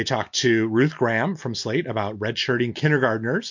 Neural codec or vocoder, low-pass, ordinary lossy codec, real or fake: none; 7.2 kHz; MP3, 48 kbps; real